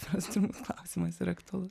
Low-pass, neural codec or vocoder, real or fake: 14.4 kHz; vocoder, 44.1 kHz, 128 mel bands every 256 samples, BigVGAN v2; fake